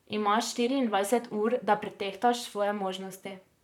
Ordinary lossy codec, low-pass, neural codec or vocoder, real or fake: none; 19.8 kHz; vocoder, 44.1 kHz, 128 mel bands, Pupu-Vocoder; fake